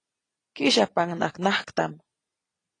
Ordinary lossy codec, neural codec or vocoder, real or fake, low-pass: AAC, 32 kbps; none; real; 9.9 kHz